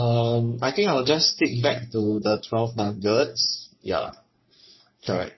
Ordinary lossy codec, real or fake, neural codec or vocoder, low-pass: MP3, 24 kbps; fake; codec, 44.1 kHz, 3.4 kbps, Pupu-Codec; 7.2 kHz